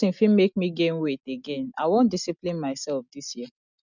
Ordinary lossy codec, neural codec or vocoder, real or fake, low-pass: none; none; real; 7.2 kHz